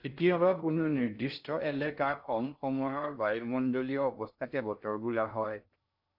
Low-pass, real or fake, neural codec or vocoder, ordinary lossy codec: 5.4 kHz; fake; codec, 16 kHz in and 24 kHz out, 0.6 kbps, FocalCodec, streaming, 2048 codes; AAC, 48 kbps